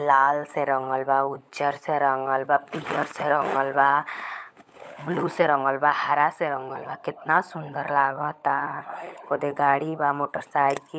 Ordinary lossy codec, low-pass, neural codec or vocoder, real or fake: none; none; codec, 16 kHz, 16 kbps, FunCodec, trained on LibriTTS, 50 frames a second; fake